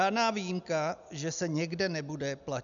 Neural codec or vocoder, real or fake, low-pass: none; real; 7.2 kHz